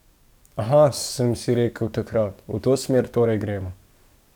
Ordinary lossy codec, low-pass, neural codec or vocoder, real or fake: none; 19.8 kHz; codec, 44.1 kHz, 7.8 kbps, DAC; fake